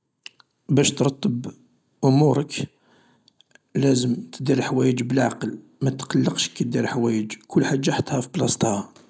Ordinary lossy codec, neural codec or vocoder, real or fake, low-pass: none; none; real; none